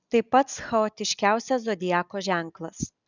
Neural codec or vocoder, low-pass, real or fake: none; 7.2 kHz; real